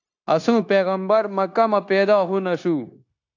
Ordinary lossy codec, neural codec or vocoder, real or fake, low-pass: AAC, 48 kbps; codec, 16 kHz, 0.9 kbps, LongCat-Audio-Codec; fake; 7.2 kHz